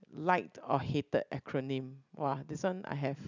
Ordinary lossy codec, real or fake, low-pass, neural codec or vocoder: none; real; 7.2 kHz; none